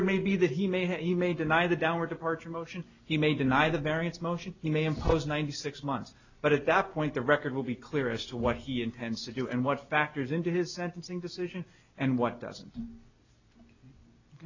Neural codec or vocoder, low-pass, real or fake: none; 7.2 kHz; real